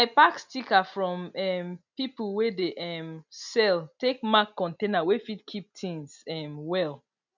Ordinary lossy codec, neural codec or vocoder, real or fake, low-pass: none; none; real; 7.2 kHz